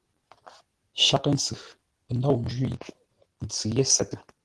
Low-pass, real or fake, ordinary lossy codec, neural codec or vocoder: 10.8 kHz; real; Opus, 16 kbps; none